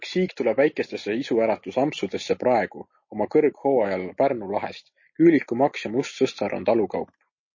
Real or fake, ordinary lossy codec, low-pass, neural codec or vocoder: real; MP3, 32 kbps; 7.2 kHz; none